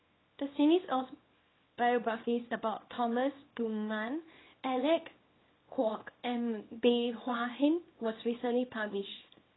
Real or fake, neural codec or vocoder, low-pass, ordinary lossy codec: fake; codec, 24 kHz, 0.9 kbps, WavTokenizer, small release; 7.2 kHz; AAC, 16 kbps